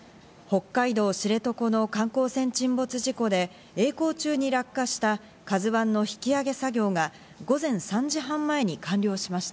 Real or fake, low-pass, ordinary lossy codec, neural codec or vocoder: real; none; none; none